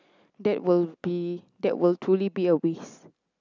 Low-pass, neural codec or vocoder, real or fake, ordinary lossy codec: 7.2 kHz; none; real; none